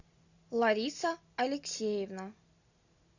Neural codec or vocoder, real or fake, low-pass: none; real; 7.2 kHz